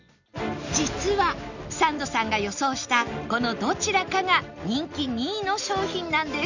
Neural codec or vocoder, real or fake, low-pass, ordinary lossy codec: none; real; 7.2 kHz; none